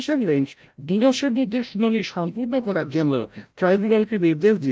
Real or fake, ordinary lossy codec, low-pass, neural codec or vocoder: fake; none; none; codec, 16 kHz, 0.5 kbps, FreqCodec, larger model